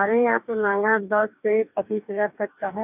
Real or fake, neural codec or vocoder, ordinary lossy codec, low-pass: fake; codec, 44.1 kHz, 2.6 kbps, DAC; none; 3.6 kHz